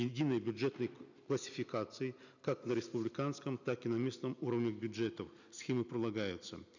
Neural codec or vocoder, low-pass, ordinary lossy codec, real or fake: none; 7.2 kHz; none; real